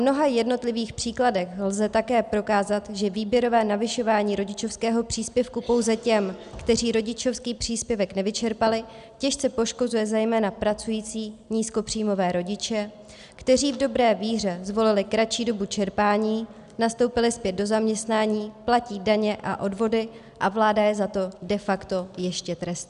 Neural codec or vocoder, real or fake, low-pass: none; real; 10.8 kHz